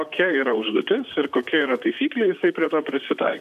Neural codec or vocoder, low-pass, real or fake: vocoder, 44.1 kHz, 128 mel bands, Pupu-Vocoder; 14.4 kHz; fake